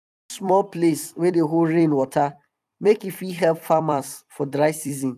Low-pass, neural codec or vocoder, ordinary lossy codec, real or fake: 14.4 kHz; vocoder, 44.1 kHz, 128 mel bands every 256 samples, BigVGAN v2; none; fake